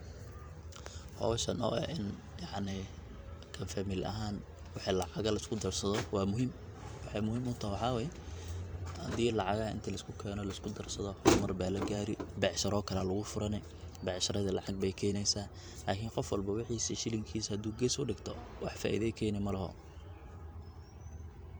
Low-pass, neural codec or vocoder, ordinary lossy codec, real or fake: none; vocoder, 44.1 kHz, 128 mel bands every 512 samples, BigVGAN v2; none; fake